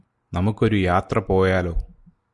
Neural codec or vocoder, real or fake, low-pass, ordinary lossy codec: none; real; 10.8 kHz; Opus, 64 kbps